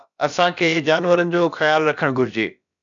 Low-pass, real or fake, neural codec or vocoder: 7.2 kHz; fake; codec, 16 kHz, about 1 kbps, DyCAST, with the encoder's durations